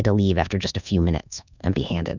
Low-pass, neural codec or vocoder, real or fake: 7.2 kHz; codec, 24 kHz, 1.2 kbps, DualCodec; fake